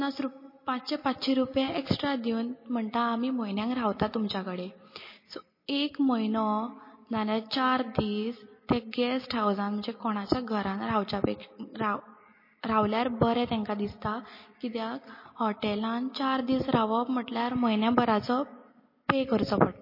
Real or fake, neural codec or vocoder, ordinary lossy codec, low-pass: real; none; MP3, 24 kbps; 5.4 kHz